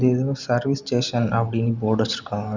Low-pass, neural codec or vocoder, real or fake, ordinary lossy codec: 7.2 kHz; none; real; Opus, 64 kbps